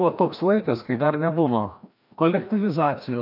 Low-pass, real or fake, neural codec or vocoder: 5.4 kHz; fake; codec, 16 kHz, 1 kbps, FreqCodec, larger model